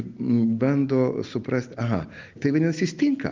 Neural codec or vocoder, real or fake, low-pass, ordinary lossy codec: none; real; 7.2 kHz; Opus, 32 kbps